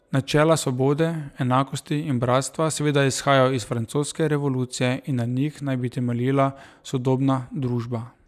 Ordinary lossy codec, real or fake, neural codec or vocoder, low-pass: none; real; none; 14.4 kHz